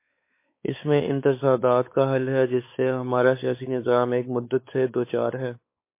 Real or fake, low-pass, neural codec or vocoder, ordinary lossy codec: fake; 3.6 kHz; codec, 16 kHz, 4 kbps, X-Codec, WavLM features, trained on Multilingual LibriSpeech; MP3, 24 kbps